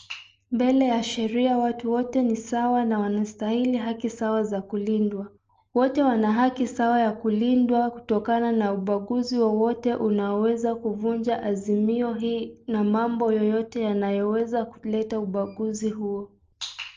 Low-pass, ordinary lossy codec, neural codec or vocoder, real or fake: 7.2 kHz; Opus, 24 kbps; none; real